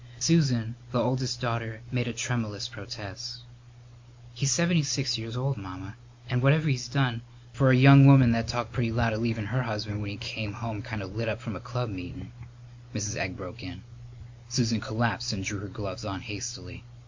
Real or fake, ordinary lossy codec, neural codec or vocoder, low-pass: real; MP3, 48 kbps; none; 7.2 kHz